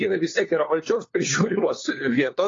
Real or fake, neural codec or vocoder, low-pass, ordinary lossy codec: fake; codec, 16 kHz, 4 kbps, FunCodec, trained on LibriTTS, 50 frames a second; 7.2 kHz; AAC, 32 kbps